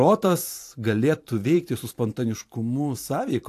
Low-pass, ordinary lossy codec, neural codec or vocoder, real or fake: 14.4 kHz; AAC, 64 kbps; vocoder, 44.1 kHz, 128 mel bands every 512 samples, BigVGAN v2; fake